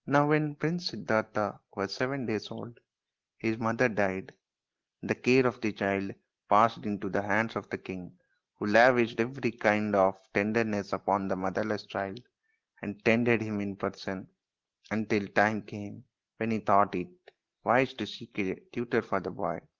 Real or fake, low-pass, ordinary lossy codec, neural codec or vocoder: real; 7.2 kHz; Opus, 16 kbps; none